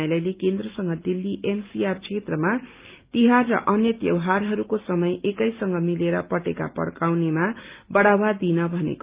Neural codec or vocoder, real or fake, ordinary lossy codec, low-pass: none; real; Opus, 32 kbps; 3.6 kHz